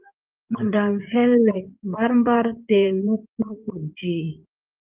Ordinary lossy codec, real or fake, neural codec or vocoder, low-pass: Opus, 32 kbps; fake; codec, 16 kHz in and 24 kHz out, 2.2 kbps, FireRedTTS-2 codec; 3.6 kHz